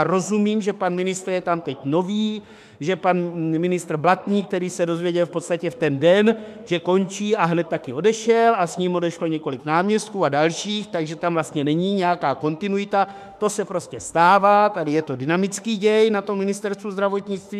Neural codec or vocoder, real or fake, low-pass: autoencoder, 48 kHz, 32 numbers a frame, DAC-VAE, trained on Japanese speech; fake; 14.4 kHz